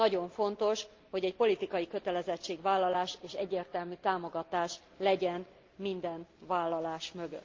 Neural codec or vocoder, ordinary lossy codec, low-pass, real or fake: none; Opus, 24 kbps; 7.2 kHz; real